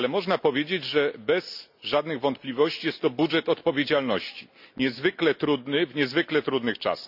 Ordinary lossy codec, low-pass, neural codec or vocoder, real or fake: none; 5.4 kHz; none; real